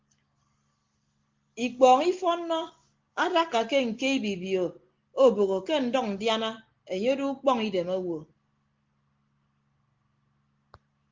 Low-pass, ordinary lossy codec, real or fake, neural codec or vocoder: 7.2 kHz; Opus, 16 kbps; real; none